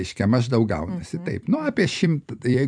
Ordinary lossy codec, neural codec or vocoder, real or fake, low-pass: MP3, 96 kbps; vocoder, 44.1 kHz, 128 mel bands every 256 samples, BigVGAN v2; fake; 9.9 kHz